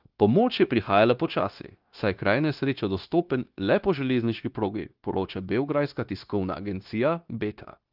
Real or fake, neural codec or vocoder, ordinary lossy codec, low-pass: fake; codec, 16 kHz, 0.9 kbps, LongCat-Audio-Codec; Opus, 32 kbps; 5.4 kHz